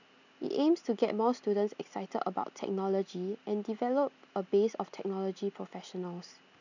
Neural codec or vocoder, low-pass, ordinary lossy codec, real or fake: none; 7.2 kHz; none; real